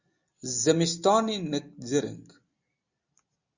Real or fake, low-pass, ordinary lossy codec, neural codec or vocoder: real; 7.2 kHz; Opus, 64 kbps; none